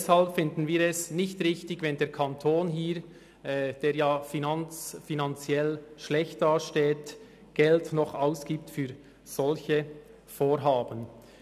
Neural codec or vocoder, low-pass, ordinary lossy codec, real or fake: none; 14.4 kHz; none; real